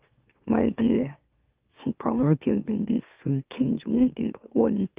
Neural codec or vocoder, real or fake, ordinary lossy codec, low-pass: autoencoder, 44.1 kHz, a latent of 192 numbers a frame, MeloTTS; fake; Opus, 32 kbps; 3.6 kHz